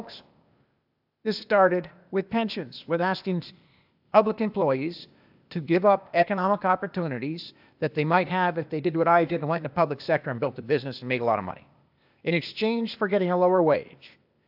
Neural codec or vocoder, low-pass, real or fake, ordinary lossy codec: codec, 16 kHz, 0.8 kbps, ZipCodec; 5.4 kHz; fake; AAC, 48 kbps